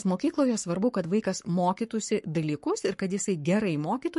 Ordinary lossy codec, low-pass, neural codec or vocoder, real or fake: MP3, 48 kbps; 14.4 kHz; codec, 44.1 kHz, 7.8 kbps, Pupu-Codec; fake